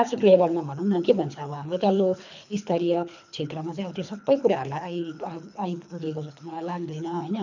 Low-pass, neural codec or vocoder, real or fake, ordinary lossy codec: 7.2 kHz; codec, 24 kHz, 3 kbps, HILCodec; fake; none